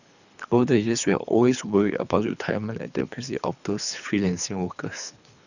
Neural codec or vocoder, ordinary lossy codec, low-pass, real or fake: codec, 24 kHz, 3 kbps, HILCodec; none; 7.2 kHz; fake